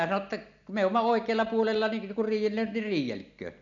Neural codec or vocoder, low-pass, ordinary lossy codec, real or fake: none; 7.2 kHz; none; real